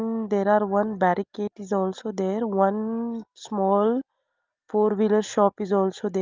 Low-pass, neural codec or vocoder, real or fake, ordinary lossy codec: 7.2 kHz; none; real; Opus, 32 kbps